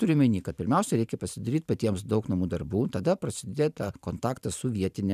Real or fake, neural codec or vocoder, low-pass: fake; vocoder, 44.1 kHz, 128 mel bands every 512 samples, BigVGAN v2; 14.4 kHz